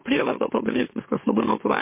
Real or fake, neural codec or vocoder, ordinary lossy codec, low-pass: fake; autoencoder, 44.1 kHz, a latent of 192 numbers a frame, MeloTTS; MP3, 24 kbps; 3.6 kHz